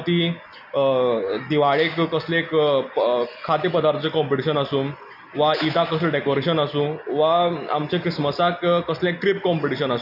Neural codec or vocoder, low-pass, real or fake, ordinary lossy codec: none; 5.4 kHz; real; none